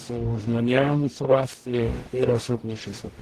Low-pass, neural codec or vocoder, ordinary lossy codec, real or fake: 14.4 kHz; codec, 44.1 kHz, 0.9 kbps, DAC; Opus, 16 kbps; fake